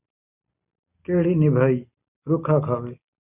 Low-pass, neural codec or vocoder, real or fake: 3.6 kHz; none; real